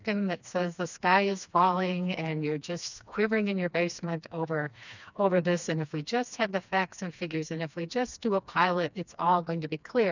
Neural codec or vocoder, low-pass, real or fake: codec, 16 kHz, 2 kbps, FreqCodec, smaller model; 7.2 kHz; fake